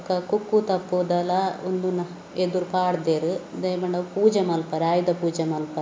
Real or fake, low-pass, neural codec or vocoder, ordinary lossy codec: real; none; none; none